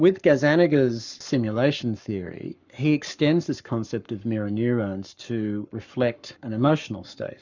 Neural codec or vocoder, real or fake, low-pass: codec, 44.1 kHz, 7.8 kbps, Pupu-Codec; fake; 7.2 kHz